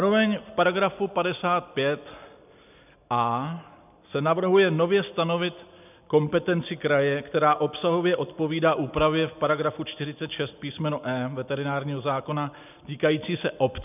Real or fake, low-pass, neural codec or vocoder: real; 3.6 kHz; none